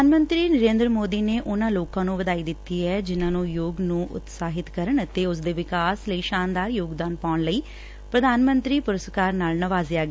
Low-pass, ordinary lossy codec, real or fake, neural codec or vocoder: none; none; real; none